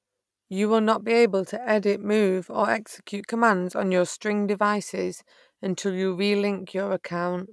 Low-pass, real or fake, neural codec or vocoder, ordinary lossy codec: none; real; none; none